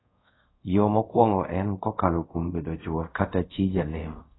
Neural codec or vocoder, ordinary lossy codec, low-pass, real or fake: codec, 24 kHz, 0.5 kbps, DualCodec; AAC, 16 kbps; 7.2 kHz; fake